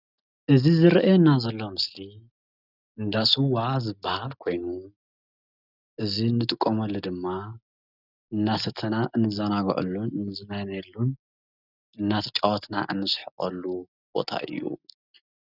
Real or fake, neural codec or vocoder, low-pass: real; none; 5.4 kHz